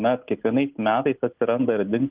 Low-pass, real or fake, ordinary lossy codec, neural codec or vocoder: 3.6 kHz; real; Opus, 32 kbps; none